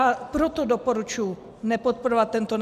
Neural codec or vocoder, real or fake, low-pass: none; real; 14.4 kHz